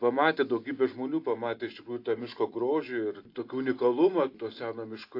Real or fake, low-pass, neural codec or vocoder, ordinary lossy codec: real; 5.4 kHz; none; AAC, 32 kbps